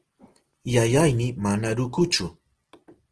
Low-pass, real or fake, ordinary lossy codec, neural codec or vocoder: 10.8 kHz; real; Opus, 16 kbps; none